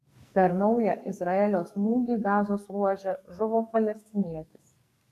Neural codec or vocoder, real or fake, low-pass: codec, 44.1 kHz, 2.6 kbps, SNAC; fake; 14.4 kHz